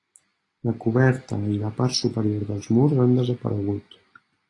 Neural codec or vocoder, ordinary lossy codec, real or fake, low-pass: none; AAC, 32 kbps; real; 10.8 kHz